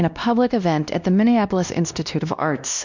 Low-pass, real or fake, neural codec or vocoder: 7.2 kHz; fake; codec, 16 kHz, 1 kbps, X-Codec, WavLM features, trained on Multilingual LibriSpeech